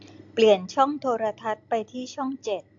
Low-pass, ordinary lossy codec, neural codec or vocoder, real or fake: 7.2 kHz; AAC, 48 kbps; none; real